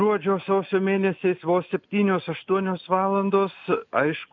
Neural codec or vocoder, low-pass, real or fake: none; 7.2 kHz; real